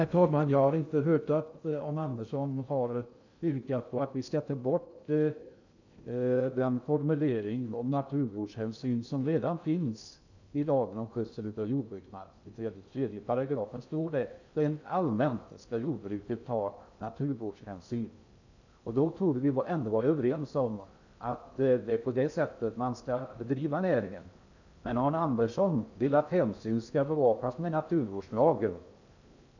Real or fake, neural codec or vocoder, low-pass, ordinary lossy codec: fake; codec, 16 kHz in and 24 kHz out, 0.6 kbps, FocalCodec, streaming, 2048 codes; 7.2 kHz; none